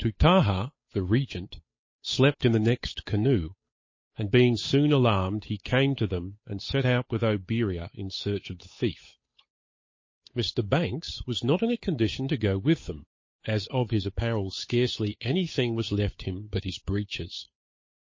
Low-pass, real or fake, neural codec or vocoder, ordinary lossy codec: 7.2 kHz; fake; codec, 16 kHz, 8 kbps, FunCodec, trained on Chinese and English, 25 frames a second; MP3, 32 kbps